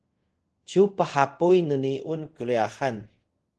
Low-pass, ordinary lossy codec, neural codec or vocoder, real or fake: 10.8 kHz; Opus, 16 kbps; codec, 24 kHz, 0.5 kbps, DualCodec; fake